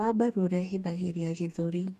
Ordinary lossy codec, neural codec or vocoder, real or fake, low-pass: none; codec, 44.1 kHz, 2.6 kbps, DAC; fake; 14.4 kHz